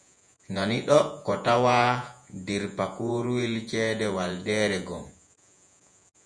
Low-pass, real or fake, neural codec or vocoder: 9.9 kHz; fake; vocoder, 48 kHz, 128 mel bands, Vocos